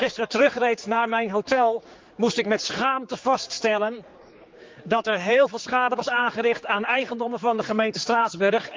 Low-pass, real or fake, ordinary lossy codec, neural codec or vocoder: 7.2 kHz; fake; Opus, 32 kbps; codec, 16 kHz, 4 kbps, X-Codec, HuBERT features, trained on general audio